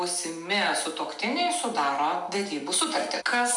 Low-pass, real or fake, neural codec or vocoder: 10.8 kHz; real; none